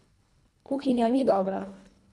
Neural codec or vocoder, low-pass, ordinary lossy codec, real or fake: codec, 24 kHz, 1.5 kbps, HILCodec; none; none; fake